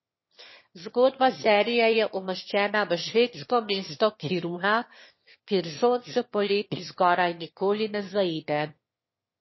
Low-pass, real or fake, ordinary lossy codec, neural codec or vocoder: 7.2 kHz; fake; MP3, 24 kbps; autoencoder, 22.05 kHz, a latent of 192 numbers a frame, VITS, trained on one speaker